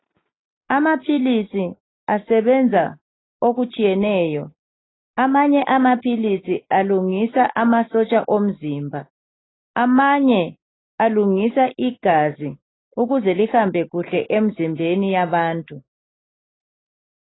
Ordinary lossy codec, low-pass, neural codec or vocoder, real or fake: AAC, 16 kbps; 7.2 kHz; none; real